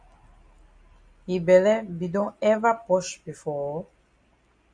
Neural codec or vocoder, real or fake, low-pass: none; real; 9.9 kHz